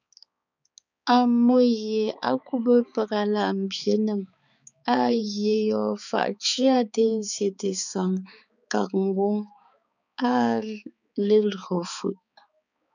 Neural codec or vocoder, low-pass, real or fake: codec, 16 kHz, 4 kbps, X-Codec, HuBERT features, trained on balanced general audio; 7.2 kHz; fake